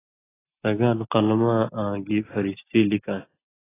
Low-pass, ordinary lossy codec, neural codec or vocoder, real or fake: 3.6 kHz; AAC, 16 kbps; none; real